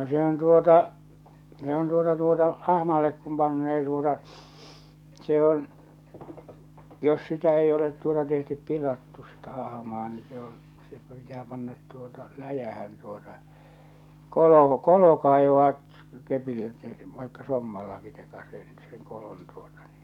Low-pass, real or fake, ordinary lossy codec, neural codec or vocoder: 19.8 kHz; fake; none; codec, 44.1 kHz, 7.8 kbps, DAC